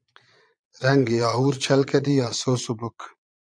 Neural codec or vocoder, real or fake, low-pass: vocoder, 22.05 kHz, 80 mel bands, Vocos; fake; 9.9 kHz